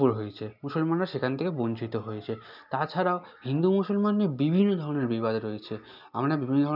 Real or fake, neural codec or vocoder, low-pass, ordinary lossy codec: real; none; 5.4 kHz; none